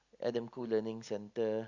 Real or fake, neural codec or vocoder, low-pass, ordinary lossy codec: real; none; 7.2 kHz; Opus, 64 kbps